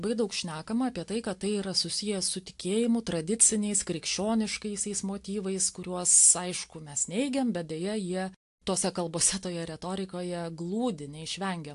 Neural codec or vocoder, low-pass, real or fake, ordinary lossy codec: none; 10.8 kHz; real; AAC, 64 kbps